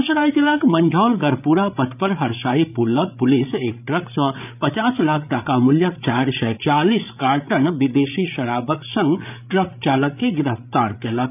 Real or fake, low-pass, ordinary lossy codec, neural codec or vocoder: fake; 3.6 kHz; none; codec, 16 kHz, 8 kbps, FreqCodec, larger model